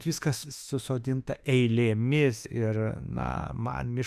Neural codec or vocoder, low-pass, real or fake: autoencoder, 48 kHz, 32 numbers a frame, DAC-VAE, trained on Japanese speech; 14.4 kHz; fake